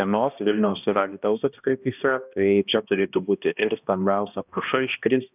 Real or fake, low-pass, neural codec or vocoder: fake; 3.6 kHz; codec, 16 kHz, 1 kbps, X-Codec, HuBERT features, trained on balanced general audio